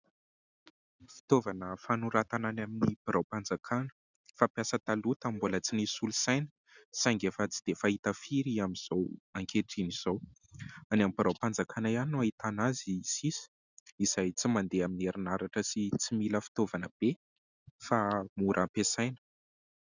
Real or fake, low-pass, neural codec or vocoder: real; 7.2 kHz; none